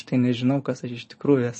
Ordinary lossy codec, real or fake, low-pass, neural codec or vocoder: MP3, 32 kbps; real; 10.8 kHz; none